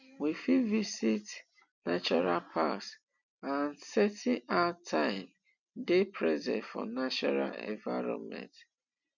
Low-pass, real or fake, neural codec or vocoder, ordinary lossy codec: 7.2 kHz; real; none; none